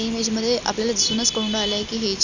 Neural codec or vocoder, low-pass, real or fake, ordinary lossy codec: none; 7.2 kHz; real; none